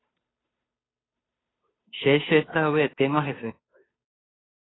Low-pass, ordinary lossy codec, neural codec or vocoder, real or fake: 7.2 kHz; AAC, 16 kbps; codec, 16 kHz, 2 kbps, FunCodec, trained on Chinese and English, 25 frames a second; fake